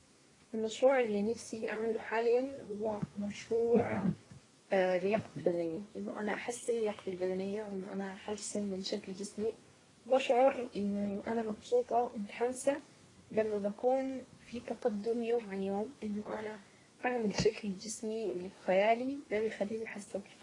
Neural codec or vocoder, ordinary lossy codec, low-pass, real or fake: codec, 24 kHz, 1 kbps, SNAC; AAC, 32 kbps; 10.8 kHz; fake